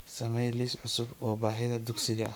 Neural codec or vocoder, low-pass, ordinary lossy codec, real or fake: codec, 44.1 kHz, 7.8 kbps, Pupu-Codec; none; none; fake